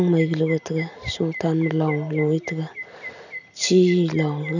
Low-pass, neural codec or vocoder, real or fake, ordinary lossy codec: 7.2 kHz; none; real; none